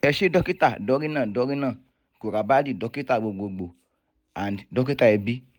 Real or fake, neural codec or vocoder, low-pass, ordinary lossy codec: real; none; none; none